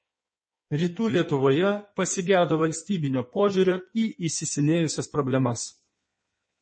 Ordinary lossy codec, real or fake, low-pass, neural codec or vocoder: MP3, 32 kbps; fake; 9.9 kHz; codec, 16 kHz in and 24 kHz out, 1.1 kbps, FireRedTTS-2 codec